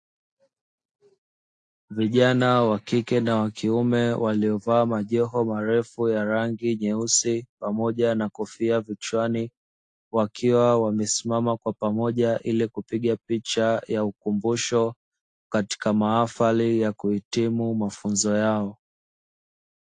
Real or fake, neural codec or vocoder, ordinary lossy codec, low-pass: real; none; AAC, 48 kbps; 9.9 kHz